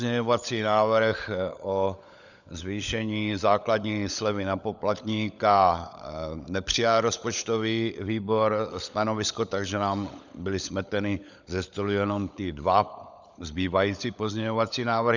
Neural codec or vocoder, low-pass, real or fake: codec, 16 kHz, 16 kbps, FunCodec, trained on LibriTTS, 50 frames a second; 7.2 kHz; fake